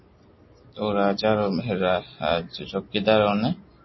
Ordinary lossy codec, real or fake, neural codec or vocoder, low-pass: MP3, 24 kbps; real; none; 7.2 kHz